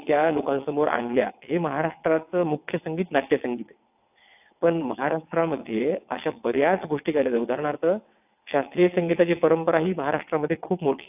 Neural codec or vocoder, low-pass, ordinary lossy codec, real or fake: vocoder, 22.05 kHz, 80 mel bands, WaveNeXt; 3.6 kHz; AAC, 32 kbps; fake